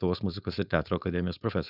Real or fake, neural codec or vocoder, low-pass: fake; codec, 16 kHz, 4.8 kbps, FACodec; 5.4 kHz